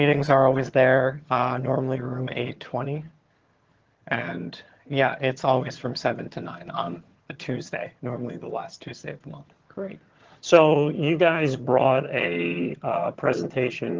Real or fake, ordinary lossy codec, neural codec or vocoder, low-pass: fake; Opus, 16 kbps; vocoder, 22.05 kHz, 80 mel bands, HiFi-GAN; 7.2 kHz